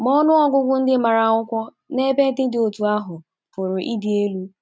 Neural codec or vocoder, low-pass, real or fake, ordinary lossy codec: none; none; real; none